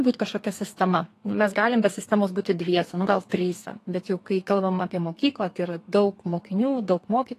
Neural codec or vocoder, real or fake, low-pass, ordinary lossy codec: codec, 32 kHz, 1.9 kbps, SNAC; fake; 14.4 kHz; AAC, 48 kbps